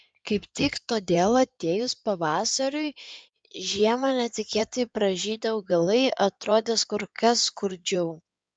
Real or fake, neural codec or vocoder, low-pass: fake; codec, 16 kHz in and 24 kHz out, 2.2 kbps, FireRedTTS-2 codec; 9.9 kHz